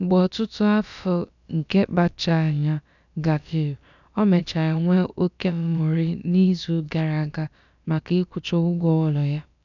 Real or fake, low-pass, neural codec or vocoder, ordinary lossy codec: fake; 7.2 kHz; codec, 16 kHz, about 1 kbps, DyCAST, with the encoder's durations; none